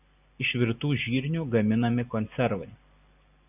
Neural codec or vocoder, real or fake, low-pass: none; real; 3.6 kHz